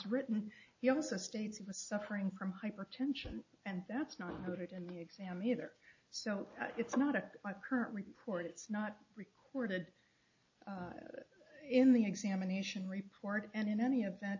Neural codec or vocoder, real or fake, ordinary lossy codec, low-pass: none; real; AAC, 48 kbps; 7.2 kHz